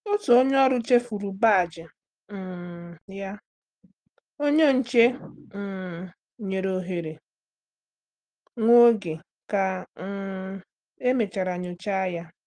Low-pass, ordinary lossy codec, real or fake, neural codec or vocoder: 9.9 kHz; Opus, 24 kbps; real; none